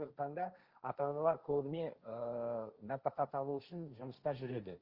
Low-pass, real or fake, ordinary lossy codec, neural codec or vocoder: 5.4 kHz; fake; Opus, 24 kbps; codec, 16 kHz, 1.1 kbps, Voila-Tokenizer